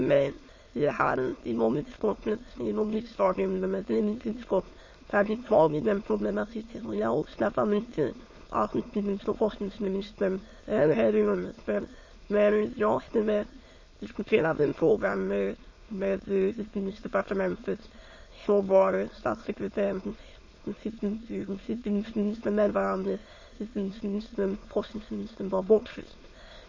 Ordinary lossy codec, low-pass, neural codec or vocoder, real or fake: MP3, 32 kbps; 7.2 kHz; autoencoder, 22.05 kHz, a latent of 192 numbers a frame, VITS, trained on many speakers; fake